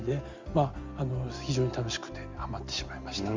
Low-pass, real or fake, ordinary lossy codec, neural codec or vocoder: 7.2 kHz; real; Opus, 32 kbps; none